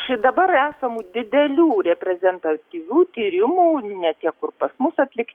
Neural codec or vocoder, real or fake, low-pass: codec, 44.1 kHz, 7.8 kbps, DAC; fake; 19.8 kHz